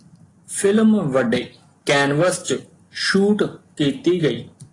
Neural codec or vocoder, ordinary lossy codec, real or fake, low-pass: none; AAC, 32 kbps; real; 10.8 kHz